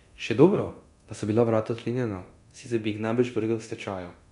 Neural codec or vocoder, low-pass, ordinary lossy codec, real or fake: codec, 24 kHz, 0.9 kbps, DualCodec; 10.8 kHz; none; fake